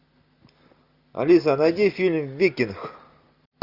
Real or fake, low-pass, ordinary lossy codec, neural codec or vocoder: real; 5.4 kHz; Opus, 64 kbps; none